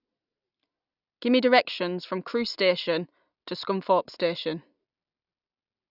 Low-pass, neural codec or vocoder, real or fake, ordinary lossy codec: 5.4 kHz; none; real; none